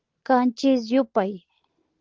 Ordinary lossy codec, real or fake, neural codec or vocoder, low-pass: Opus, 16 kbps; real; none; 7.2 kHz